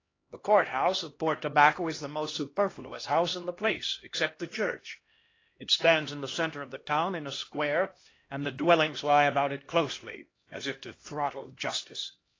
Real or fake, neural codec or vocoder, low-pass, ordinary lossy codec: fake; codec, 16 kHz, 1 kbps, X-Codec, HuBERT features, trained on LibriSpeech; 7.2 kHz; AAC, 32 kbps